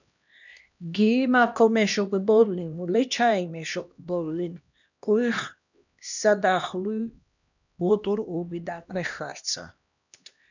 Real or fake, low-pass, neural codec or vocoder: fake; 7.2 kHz; codec, 16 kHz, 1 kbps, X-Codec, HuBERT features, trained on LibriSpeech